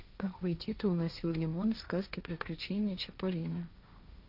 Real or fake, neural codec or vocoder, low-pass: fake; codec, 16 kHz, 1.1 kbps, Voila-Tokenizer; 5.4 kHz